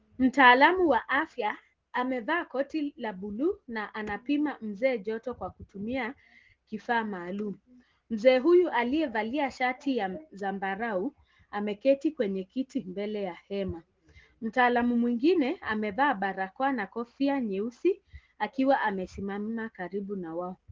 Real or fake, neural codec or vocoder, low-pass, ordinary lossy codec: real; none; 7.2 kHz; Opus, 16 kbps